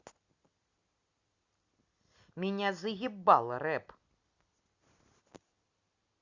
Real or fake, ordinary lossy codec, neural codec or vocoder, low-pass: real; Opus, 64 kbps; none; 7.2 kHz